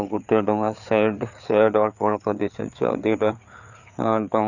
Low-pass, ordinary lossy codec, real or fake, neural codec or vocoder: 7.2 kHz; none; fake; codec, 16 kHz, 4 kbps, FreqCodec, larger model